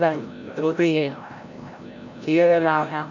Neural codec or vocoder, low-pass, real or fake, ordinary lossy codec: codec, 16 kHz, 0.5 kbps, FreqCodec, larger model; 7.2 kHz; fake; none